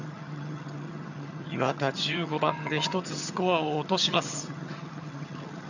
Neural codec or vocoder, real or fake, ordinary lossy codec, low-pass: vocoder, 22.05 kHz, 80 mel bands, HiFi-GAN; fake; none; 7.2 kHz